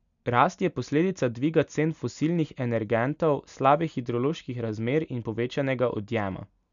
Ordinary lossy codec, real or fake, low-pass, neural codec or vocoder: none; real; 7.2 kHz; none